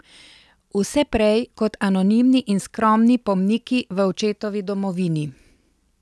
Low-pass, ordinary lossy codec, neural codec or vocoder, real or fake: none; none; none; real